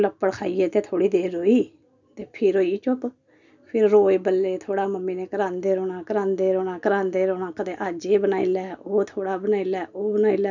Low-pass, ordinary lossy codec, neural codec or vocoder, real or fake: 7.2 kHz; none; none; real